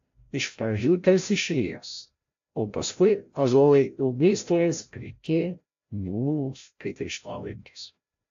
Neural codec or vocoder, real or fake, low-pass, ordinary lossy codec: codec, 16 kHz, 0.5 kbps, FreqCodec, larger model; fake; 7.2 kHz; AAC, 48 kbps